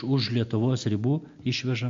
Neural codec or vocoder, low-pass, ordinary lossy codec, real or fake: none; 7.2 kHz; AAC, 64 kbps; real